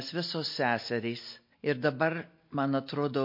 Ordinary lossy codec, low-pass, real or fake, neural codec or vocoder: MP3, 32 kbps; 5.4 kHz; real; none